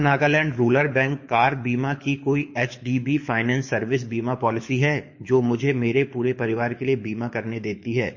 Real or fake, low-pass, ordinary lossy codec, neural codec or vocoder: fake; 7.2 kHz; MP3, 32 kbps; codec, 24 kHz, 6 kbps, HILCodec